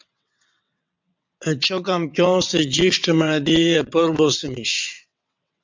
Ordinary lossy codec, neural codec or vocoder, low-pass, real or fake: MP3, 64 kbps; vocoder, 22.05 kHz, 80 mel bands, WaveNeXt; 7.2 kHz; fake